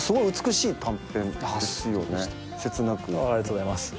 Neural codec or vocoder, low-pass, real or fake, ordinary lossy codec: none; none; real; none